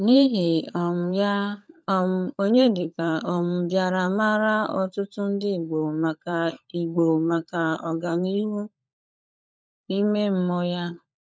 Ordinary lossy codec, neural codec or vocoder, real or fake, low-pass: none; codec, 16 kHz, 16 kbps, FunCodec, trained on LibriTTS, 50 frames a second; fake; none